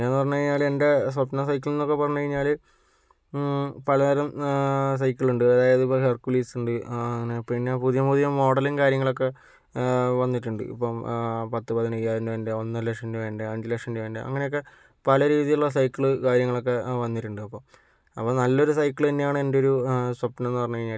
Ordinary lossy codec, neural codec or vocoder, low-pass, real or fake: none; none; none; real